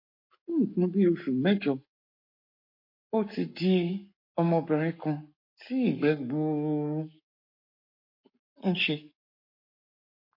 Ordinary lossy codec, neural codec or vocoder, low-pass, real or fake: MP3, 32 kbps; codec, 44.1 kHz, 7.8 kbps, Pupu-Codec; 5.4 kHz; fake